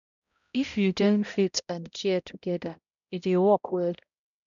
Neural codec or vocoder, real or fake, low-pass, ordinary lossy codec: codec, 16 kHz, 0.5 kbps, X-Codec, HuBERT features, trained on balanced general audio; fake; 7.2 kHz; none